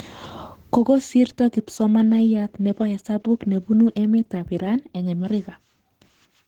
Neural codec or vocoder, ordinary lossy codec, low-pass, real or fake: codec, 44.1 kHz, 7.8 kbps, Pupu-Codec; Opus, 16 kbps; 19.8 kHz; fake